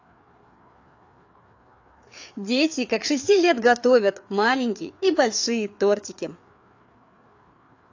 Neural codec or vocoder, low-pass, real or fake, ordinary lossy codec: codec, 16 kHz, 4 kbps, FreqCodec, larger model; 7.2 kHz; fake; AAC, 48 kbps